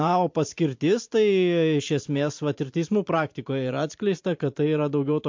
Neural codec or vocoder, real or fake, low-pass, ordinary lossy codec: vocoder, 44.1 kHz, 128 mel bands every 512 samples, BigVGAN v2; fake; 7.2 kHz; MP3, 48 kbps